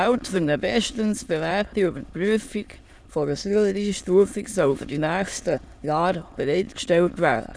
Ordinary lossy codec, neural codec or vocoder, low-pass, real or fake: none; autoencoder, 22.05 kHz, a latent of 192 numbers a frame, VITS, trained on many speakers; none; fake